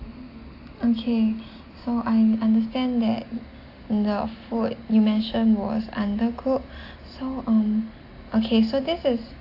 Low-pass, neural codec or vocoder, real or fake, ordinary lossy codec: 5.4 kHz; none; real; AAC, 32 kbps